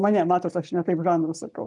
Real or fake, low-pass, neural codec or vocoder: real; 10.8 kHz; none